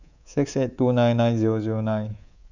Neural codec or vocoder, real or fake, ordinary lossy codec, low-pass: codec, 24 kHz, 3.1 kbps, DualCodec; fake; none; 7.2 kHz